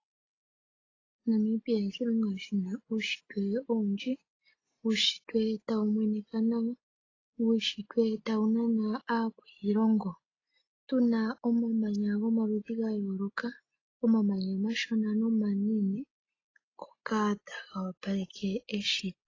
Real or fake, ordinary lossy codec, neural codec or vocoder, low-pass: real; AAC, 32 kbps; none; 7.2 kHz